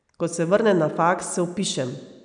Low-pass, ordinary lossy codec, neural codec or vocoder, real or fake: 9.9 kHz; none; none; real